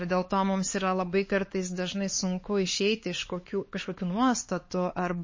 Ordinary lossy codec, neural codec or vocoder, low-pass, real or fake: MP3, 32 kbps; codec, 16 kHz, 4 kbps, X-Codec, HuBERT features, trained on LibriSpeech; 7.2 kHz; fake